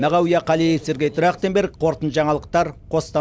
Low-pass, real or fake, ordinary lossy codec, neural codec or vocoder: none; real; none; none